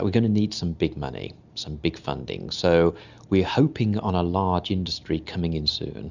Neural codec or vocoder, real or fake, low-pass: none; real; 7.2 kHz